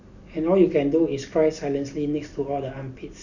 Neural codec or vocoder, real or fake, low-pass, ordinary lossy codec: none; real; 7.2 kHz; none